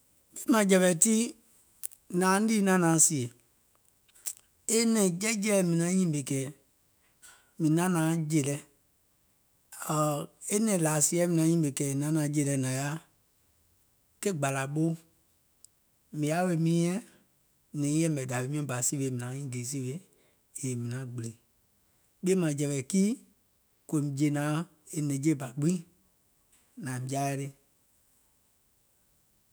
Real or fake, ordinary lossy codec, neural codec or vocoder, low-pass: fake; none; autoencoder, 48 kHz, 128 numbers a frame, DAC-VAE, trained on Japanese speech; none